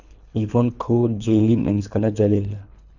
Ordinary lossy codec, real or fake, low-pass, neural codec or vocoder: none; fake; 7.2 kHz; codec, 24 kHz, 3 kbps, HILCodec